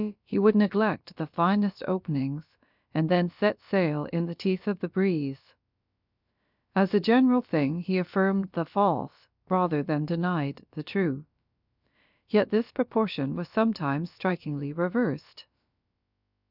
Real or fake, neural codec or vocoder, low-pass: fake; codec, 16 kHz, about 1 kbps, DyCAST, with the encoder's durations; 5.4 kHz